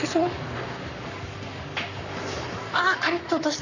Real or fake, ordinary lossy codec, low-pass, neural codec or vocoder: fake; none; 7.2 kHz; codec, 44.1 kHz, 7.8 kbps, Pupu-Codec